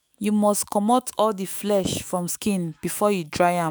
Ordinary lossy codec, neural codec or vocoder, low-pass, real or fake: none; autoencoder, 48 kHz, 128 numbers a frame, DAC-VAE, trained on Japanese speech; none; fake